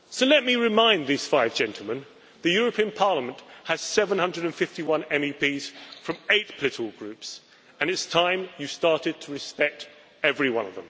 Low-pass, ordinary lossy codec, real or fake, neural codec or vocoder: none; none; real; none